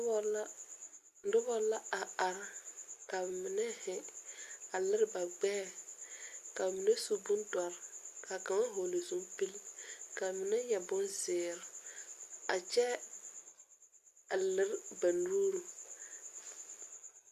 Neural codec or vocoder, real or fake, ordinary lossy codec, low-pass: none; real; Opus, 64 kbps; 14.4 kHz